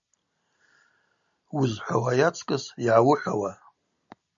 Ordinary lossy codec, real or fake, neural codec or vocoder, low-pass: MP3, 64 kbps; real; none; 7.2 kHz